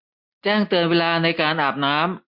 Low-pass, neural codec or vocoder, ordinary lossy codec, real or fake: 5.4 kHz; none; MP3, 48 kbps; real